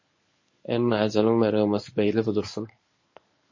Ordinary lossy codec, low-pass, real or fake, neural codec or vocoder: MP3, 32 kbps; 7.2 kHz; fake; codec, 24 kHz, 0.9 kbps, WavTokenizer, medium speech release version 1